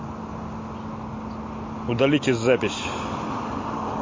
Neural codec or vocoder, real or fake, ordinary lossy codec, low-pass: none; real; MP3, 32 kbps; 7.2 kHz